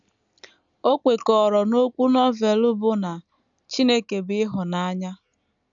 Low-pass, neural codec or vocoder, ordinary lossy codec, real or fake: 7.2 kHz; none; none; real